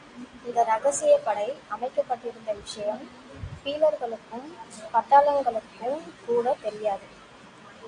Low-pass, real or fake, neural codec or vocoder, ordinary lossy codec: 9.9 kHz; real; none; AAC, 48 kbps